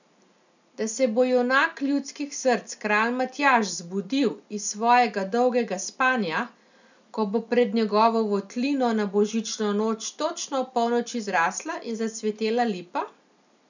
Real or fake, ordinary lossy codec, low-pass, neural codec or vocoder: real; none; 7.2 kHz; none